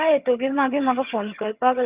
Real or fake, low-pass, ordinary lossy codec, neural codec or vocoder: fake; 3.6 kHz; Opus, 64 kbps; vocoder, 22.05 kHz, 80 mel bands, HiFi-GAN